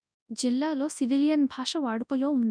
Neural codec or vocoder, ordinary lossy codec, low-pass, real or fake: codec, 24 kHz, 0.9 kbps, WavTokenizer, large speech release; none; 9.9 kHz; fake